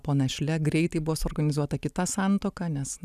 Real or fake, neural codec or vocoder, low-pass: real; none; 14.4 kHz